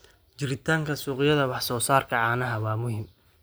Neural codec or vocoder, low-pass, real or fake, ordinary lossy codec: none; none; real; none